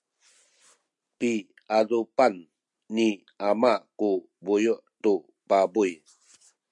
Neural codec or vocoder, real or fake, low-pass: none; real; 10.8 kHz